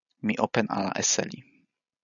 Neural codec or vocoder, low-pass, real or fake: none; 7.2 kHz; real